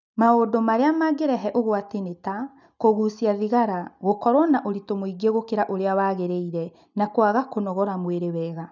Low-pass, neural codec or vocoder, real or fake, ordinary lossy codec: 7.2 kHz; none; real; none